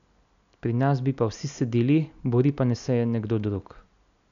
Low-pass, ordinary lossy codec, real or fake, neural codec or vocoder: 7.2 kHz; none; real; none